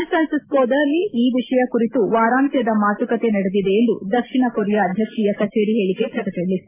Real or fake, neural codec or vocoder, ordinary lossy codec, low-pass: real; none; MP3, 24 kbps; 3.6 kHz